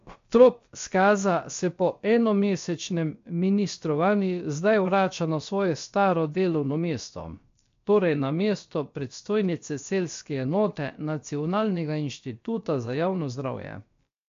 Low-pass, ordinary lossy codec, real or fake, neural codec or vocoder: 7.2 kHz; MP3, 48 kbps; fake; codec, 16 kHz, about 1 kbps, DyCAST, with the encoder's durations